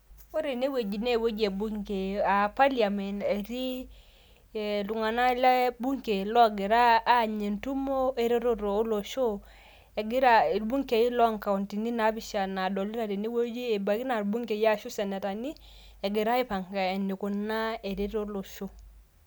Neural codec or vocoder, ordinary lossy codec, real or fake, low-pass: none; none; real; none